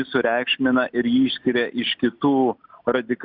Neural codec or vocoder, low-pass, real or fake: none; 5.4 kHz; real